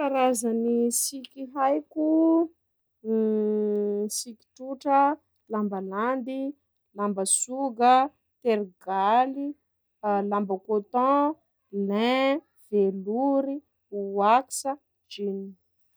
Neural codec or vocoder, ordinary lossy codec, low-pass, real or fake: none; none; none; real